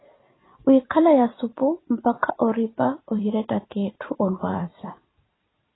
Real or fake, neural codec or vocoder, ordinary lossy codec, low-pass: fake; vocoder, 44.1 kHz, 128 mel bands every 256 samples, BigVGAN v2; AAC, 16 kbps; 7.2 kHz